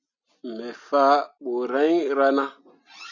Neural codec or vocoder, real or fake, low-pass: none; real; 7.2 kHz